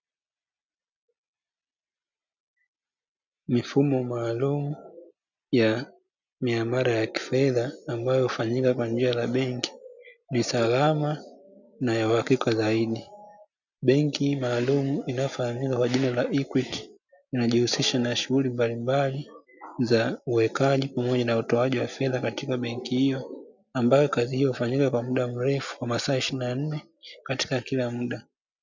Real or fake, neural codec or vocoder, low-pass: real; none; 7.2 kHz